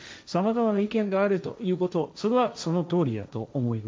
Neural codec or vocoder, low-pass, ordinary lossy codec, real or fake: codec, 16 kHz, 1.1 kbps, Voila-Tokenizer; none; none; fake